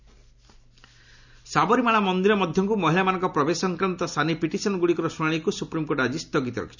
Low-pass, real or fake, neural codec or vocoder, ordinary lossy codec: 7.2 kHz; real; none; none